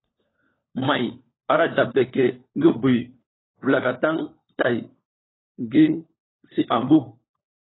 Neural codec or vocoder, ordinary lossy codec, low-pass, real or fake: codec, 16 kHz, 4 kbps, FunCodec, trained on LibriTTS, 50 frames a second; AAC, 16 kbps; 7.2 kHz; fake